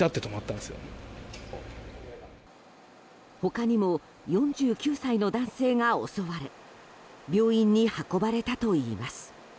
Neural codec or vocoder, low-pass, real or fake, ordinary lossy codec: none; none; real; none